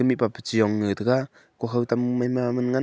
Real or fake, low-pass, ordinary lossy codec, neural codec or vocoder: real; none; none; none